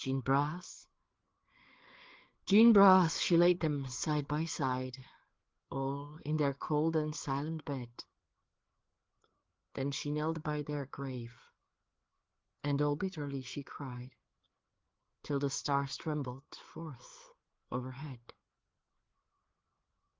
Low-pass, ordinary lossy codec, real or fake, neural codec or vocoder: 7.2 kHz; Opus, 16 kbps; fake; codec, 16 kHz, 4 kbps, FreqCodec, larger model